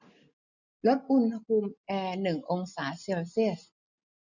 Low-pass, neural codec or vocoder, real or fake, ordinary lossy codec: 7.2 kHz; none; real; none